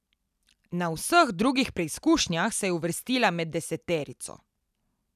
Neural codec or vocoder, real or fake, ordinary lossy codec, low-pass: none; real; none; 14.4 kHz